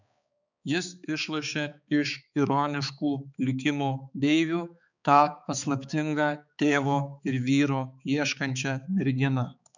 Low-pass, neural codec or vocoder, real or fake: 7.2 kHz; codec, 16 kHz, 4 kbps, X-Codec, HuBERT features, trained on balanced general audio; fake